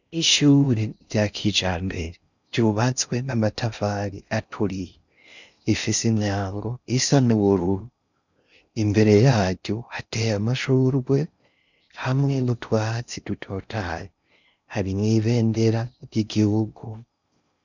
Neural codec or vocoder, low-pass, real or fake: codec, 16 kHz in and 24 kHz out, 0.6 kbps, FocalCodec, streaming, 4096 codes; 7.2 kHz; fake